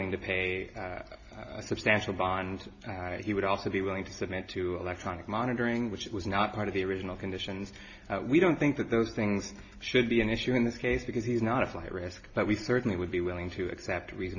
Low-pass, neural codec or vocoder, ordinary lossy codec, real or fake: 7.2 kHz; none; AAC, 48 kbps; real